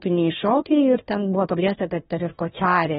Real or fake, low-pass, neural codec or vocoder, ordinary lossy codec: fake; 7.2 kHz; codec, 16 kHz, 1 kbps, FunCodec, trained on Chinese and English, 50 frames a second; AAC, 16 kbps